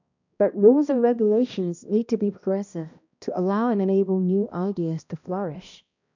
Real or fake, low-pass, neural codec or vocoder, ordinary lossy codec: fake; 7.2 kHz; codec, 16 kHz, 1 kbps, X-Codec, HuBERT features, trained on balanced general audio; none